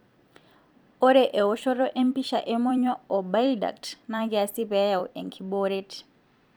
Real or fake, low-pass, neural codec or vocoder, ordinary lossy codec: fake; none; vocoder, 44.1 kHz, 128 mel bands every 256 samples, BigVGAN v2; none